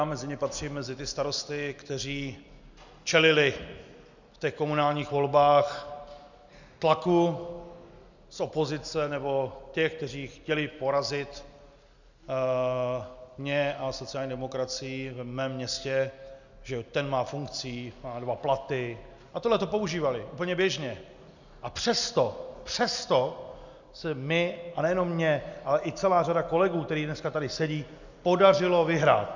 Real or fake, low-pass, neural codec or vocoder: real; 7.2 kHz; none